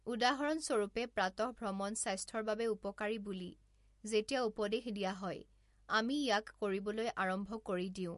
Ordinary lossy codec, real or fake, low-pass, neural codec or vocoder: MP3, 48 kbps; real; 14.4 kHz; none